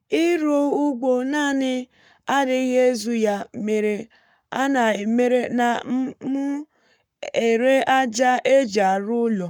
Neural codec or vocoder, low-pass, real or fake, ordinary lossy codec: codec, 44.1 kHz, 7.8 kbps, Pupu-Codec; 19.8 kHz; fake; none